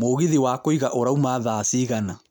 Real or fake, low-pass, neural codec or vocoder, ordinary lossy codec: real; none; none; none